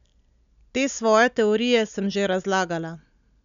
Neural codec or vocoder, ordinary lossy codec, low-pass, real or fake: none; none; 7.2 kHz; real